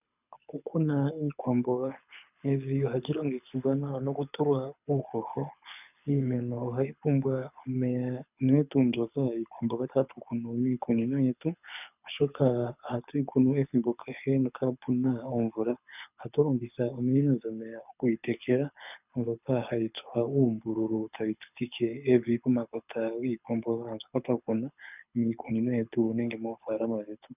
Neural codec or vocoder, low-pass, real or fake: codec, 24 kHz, 6 kbps, HILCodec; 3.6 kHz; fake